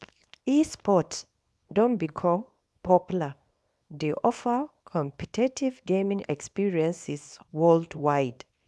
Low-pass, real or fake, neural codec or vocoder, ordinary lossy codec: none; fake; codec, 24 kHz, 0.9 kbps, WavTokenizer, small release; none